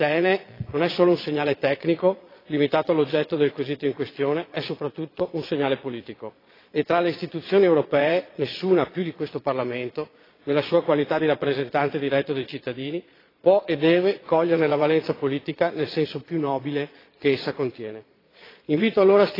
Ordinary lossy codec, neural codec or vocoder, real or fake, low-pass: AAC, 24 kbps; vocoder, 22.05 kHz, 80 mel bands, Vocos; fake; 5.4 kHz